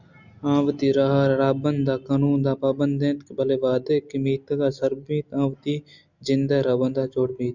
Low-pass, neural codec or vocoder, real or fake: 7.2 kHz; none; real